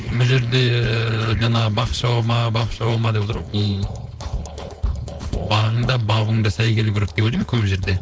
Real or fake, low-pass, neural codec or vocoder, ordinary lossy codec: fake; none; codec, 16 kHz, 4.8 kbps, FACodec; none